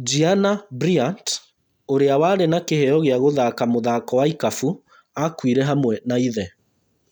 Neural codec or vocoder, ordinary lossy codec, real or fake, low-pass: none; none; real; none